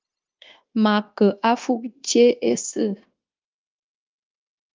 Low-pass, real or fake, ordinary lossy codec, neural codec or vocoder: 7.2 kHz; fake; Opus, 32 kbps; codec, 16 kHz, 0.9 kbps, LongCat-Audio-Codec